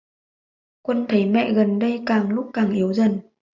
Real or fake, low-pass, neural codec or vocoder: real; 7.2 kHz; none